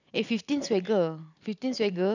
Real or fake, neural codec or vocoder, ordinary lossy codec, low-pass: real; none; none; 7.2 kHz